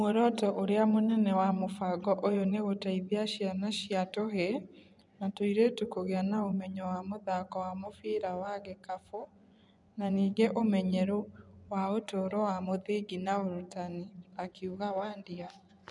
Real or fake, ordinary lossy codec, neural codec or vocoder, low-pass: fake; none; vocoder, 24 kHz, 100 mel bands, Vocos; 10.8 kHz